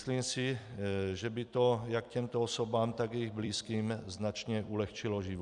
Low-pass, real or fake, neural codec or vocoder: 10.8 kHz; fake; vocoder, 44.1 kHz, 128 mel bands every 256 samples, BigVGAN v2